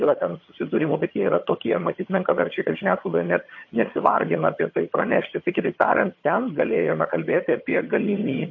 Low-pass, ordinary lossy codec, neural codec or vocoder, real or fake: 7.2 kHz; MP3, 32 kbps; vocoder, 22.05 kHz, 80 mel bands, HiFi-GAN; fake